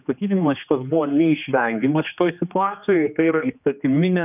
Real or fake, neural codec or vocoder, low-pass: fake; codec, 16 kHz, 2 kbps, X-Codec, HuBERT features, trained on general audio; 3.6 kHz